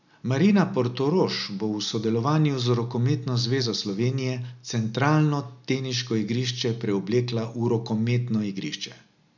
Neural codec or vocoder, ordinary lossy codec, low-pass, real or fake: none; none; 7.2 kHz; real